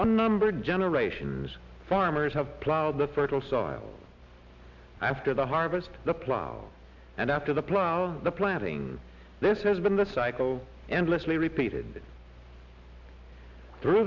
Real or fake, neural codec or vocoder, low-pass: real; none; 7.2 kHz